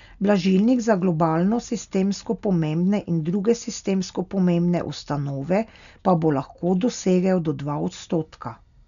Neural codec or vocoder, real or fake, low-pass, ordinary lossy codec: none; real; 7.2 kHz; none